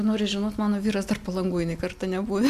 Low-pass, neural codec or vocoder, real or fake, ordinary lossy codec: 14.4 kHz; none; real; MP3, 96 kbps